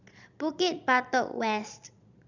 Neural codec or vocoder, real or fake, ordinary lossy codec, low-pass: vocoder, 22.05 kHz, 80 mel bands, Vocos; fake; none; 7.2 kHz